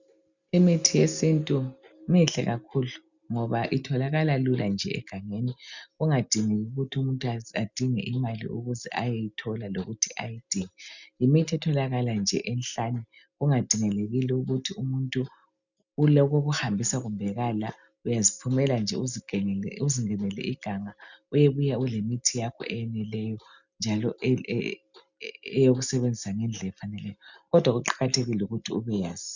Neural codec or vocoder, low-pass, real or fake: none; 7.2 kHz; real